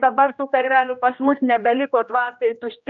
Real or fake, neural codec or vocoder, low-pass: fake; codec, 16 kHz, 1 kbps, X-Codec, HuBERT features, trained on general audio; 7.2 kHz